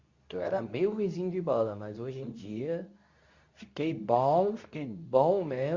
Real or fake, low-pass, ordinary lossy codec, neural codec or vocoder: fake; 7.2 kHz; Opus, 64 kbps; codec, 24 kHz, 0.9 kbps, WavTokenizer, medium speech release version 2